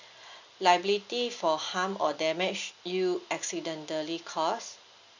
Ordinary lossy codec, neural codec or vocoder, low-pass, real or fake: none; none; 7.2 kHz; real